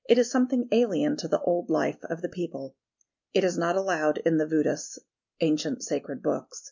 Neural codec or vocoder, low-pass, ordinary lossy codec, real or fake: none; 7.2 kHz; MP3, 64 kbps; real